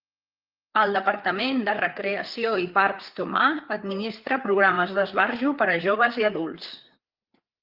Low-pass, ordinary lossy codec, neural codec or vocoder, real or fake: 5.4 kHz; Opus, 32 kbps; codec, 16 kHz, 4 kbps, FreqCodec, larger model; fake